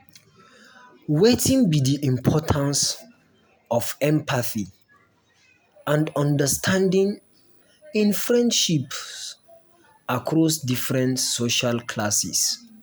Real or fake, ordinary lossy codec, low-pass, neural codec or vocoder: real; none; none; none